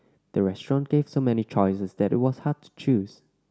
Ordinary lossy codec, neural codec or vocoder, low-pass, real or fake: none; none; none; real